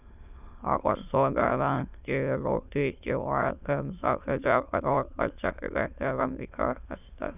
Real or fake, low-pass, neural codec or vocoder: fake; 3.6 kHz; autoencoder, 22.05 kHz, a latent of 192 numbers a frame, VITS, trained on many speakers